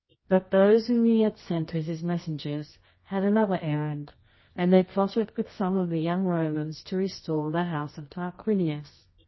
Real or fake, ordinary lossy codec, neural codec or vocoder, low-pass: fake; MP3, 24 kbps; codec, 24 kHz, 0.9 kbps, WavTokenizer, medium music audio release; 7.2 kHz